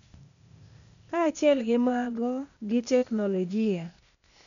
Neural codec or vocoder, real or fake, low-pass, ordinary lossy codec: codec, 16 kHz, 0.8 kbps, ZipCodec; fake; 7.2 kHz; none